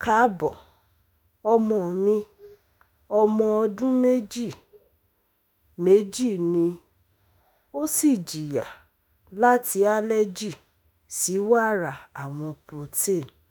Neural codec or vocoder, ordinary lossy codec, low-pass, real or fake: autoencoder, 48 kHz, 32 numbers a frame, DAC-VAE, trained on Japanese speech; none; none; fake